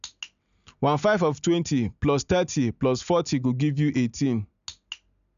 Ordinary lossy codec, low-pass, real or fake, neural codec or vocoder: none; 7.2 kHz; real; none